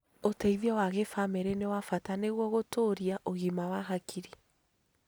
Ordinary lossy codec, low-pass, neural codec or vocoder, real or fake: none; none; none; real